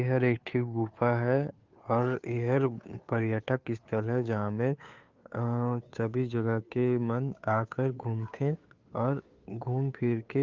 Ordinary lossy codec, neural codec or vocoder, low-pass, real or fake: Opus, 32 kbps; codec, 16 kHz, 2 kbps, FunCodec, trained on Chinese and English, 25 frames a second; 7.2 kHz; fake